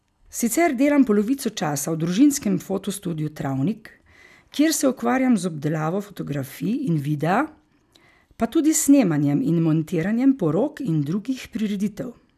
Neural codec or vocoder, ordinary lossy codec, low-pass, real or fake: none; none; 14.4 kHz; real